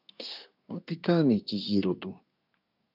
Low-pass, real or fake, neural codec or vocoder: 5.4 kHz; fake; codec, 24 kHz, 1 kbps, SNAC